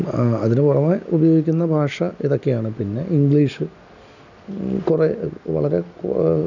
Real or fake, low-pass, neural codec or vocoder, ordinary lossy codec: real; 7.2 kHz; none; none